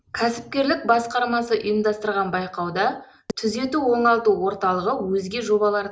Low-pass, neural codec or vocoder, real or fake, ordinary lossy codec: none; none; real; none